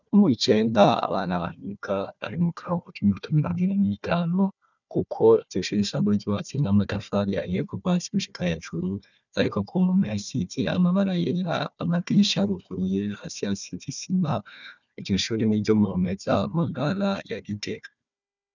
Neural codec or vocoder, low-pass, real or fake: codec, 16 kHz, 1 kbps, FunCodec, trained on Chinese and English, 50 frames a second; 7.2 kHz; fake